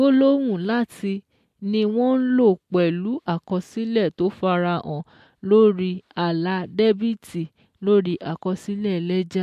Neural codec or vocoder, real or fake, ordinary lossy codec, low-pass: none; real; MP3, 64 kbps; 14.4 kHz